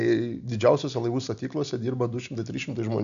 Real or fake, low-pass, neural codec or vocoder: real; 7.2 kHz; none